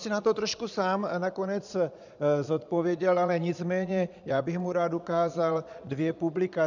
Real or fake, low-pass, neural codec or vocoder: fake; 7.2 kHz; vocoder, 22.05 kHz, 80 mel bands, Vocos